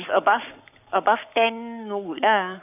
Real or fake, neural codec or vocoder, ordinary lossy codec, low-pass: fake; codec, 44.1 kHz, 7.8 kbps, Pupu-Codec; none; 3.6 kHz